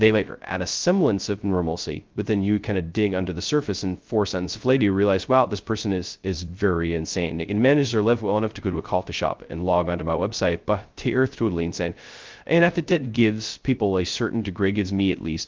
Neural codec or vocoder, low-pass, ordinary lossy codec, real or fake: codec, 16 kHz, 0.2 kbps, FocalCodec; 7.2 kHz; Opus, 32 kbps; fake